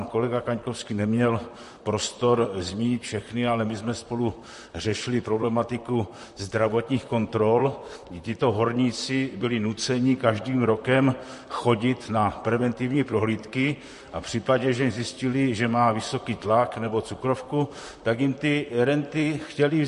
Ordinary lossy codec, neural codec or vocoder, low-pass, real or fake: MP3, 48 kbps; vocoder, 44.1 kHz, 128 mel bands, Pupu-Vocoder; 14.4 kHz; fake